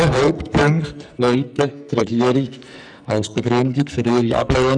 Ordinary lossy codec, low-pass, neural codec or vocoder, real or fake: none; 9.9 kHz; codec, 44.1 kHz, 2.6 kbps, SNAC; fake